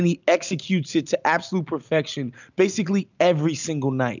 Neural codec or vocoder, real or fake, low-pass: none; real; 7.2 kHz